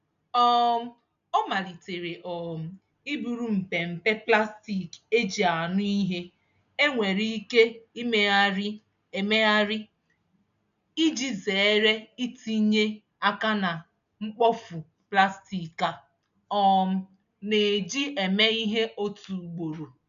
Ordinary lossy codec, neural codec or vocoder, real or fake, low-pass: none; none; real; 7.2 kHz